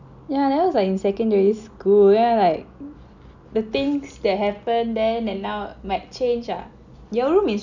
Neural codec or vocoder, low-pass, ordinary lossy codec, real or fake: none; 7.2 kHz; none; real